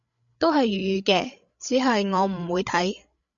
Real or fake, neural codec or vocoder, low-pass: fake; codec, 16 kHz, 16 kbps, FreqCodec, larger model; 7.2 kHz